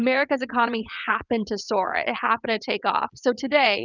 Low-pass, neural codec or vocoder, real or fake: 7.2 kHz; vocoder, 22.05 kHz, 80 mel bands, WaveNeXt; fake